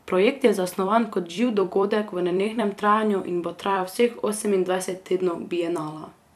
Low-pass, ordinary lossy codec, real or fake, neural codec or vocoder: 14.4 kHz; none; fake; vocoder, 44.1 kHz, 128 mel bands every 256 samples, BigVGAN v2